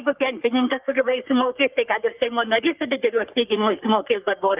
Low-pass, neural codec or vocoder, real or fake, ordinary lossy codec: 3.6 kHz; codec, 44.1 kHz, 3.4 kbps, Pupu-Codec; fake; Opus, 32 kbps